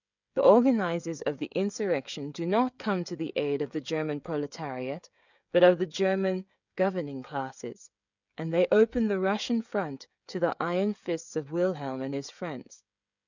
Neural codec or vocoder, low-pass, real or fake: codec, 16 kHz, 16 kbps, FreqCodec, smaller model; 7.2 kHz; fake